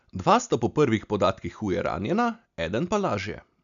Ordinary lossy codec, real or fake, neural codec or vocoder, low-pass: none; real; none; 7.2 kHz